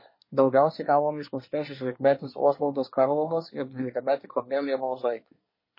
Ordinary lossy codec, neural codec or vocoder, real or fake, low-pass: MP3, 24 kbps; codec, 24 kHz, 1 kbps, SNAC; fake; 5.4 kHz